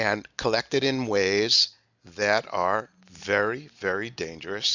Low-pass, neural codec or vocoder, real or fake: 7.2 kHz; none; real